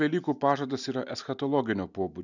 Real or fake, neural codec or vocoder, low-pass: real; none; 7.2 kHz